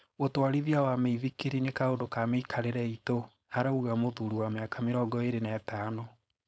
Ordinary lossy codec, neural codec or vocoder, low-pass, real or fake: none; codec, 16 kHz, 4.8 kbps, FACodec; none; fake